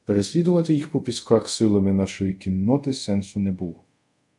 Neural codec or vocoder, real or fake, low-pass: codec, 24 kHz, 0.5 kbps, DualCodec; fake; 10.8 kHz